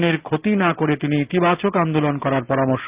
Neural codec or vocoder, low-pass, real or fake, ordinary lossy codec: none; 3.6 kHz; real; Opus, 32 kbps